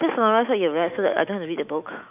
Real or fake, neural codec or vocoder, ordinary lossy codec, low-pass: fake; autoencoder, 48 kHz, 128 numbers a frame, DAC-VAE, trained on Japanese speech; none; 3.6 kHz